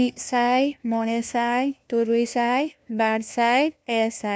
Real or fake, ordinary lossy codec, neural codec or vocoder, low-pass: fake; none; codec, 16 kHz, 1 kbps, FunCodec, trained on LibriTTS, 50 frames a second; none